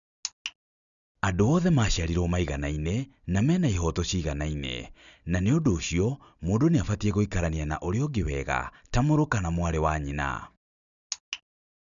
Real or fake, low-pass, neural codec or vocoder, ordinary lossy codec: real; 7.2 kHz; none; none